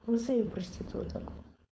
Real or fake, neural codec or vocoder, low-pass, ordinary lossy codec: fake; codec, 16 kHz, 4.8 kbps, FACodec; none; none